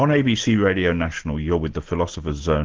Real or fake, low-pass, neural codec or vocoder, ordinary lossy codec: real; 7.2 kHz; none; Opus, 16 kbps